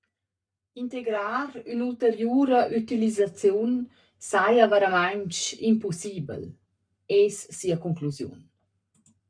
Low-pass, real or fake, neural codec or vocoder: 9.9 kHz; fake; codec, 44.1 kHz, 7.8 kbps, Pupu-Codec